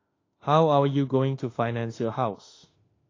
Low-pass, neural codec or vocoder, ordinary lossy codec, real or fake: 7.2 kHz; autoencoder, 48 kHz, 32 numbers a frame, DAC-VAE, trained on Japanese speech; AAC, 32 kbps; fake